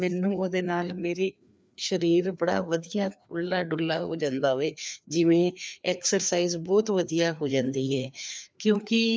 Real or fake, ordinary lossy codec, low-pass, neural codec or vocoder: fake; none; none; codec, 16 kHz, 2 kbps, FreqCodec, larger model